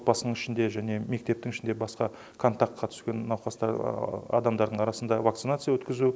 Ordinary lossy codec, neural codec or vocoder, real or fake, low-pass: none; none; real; none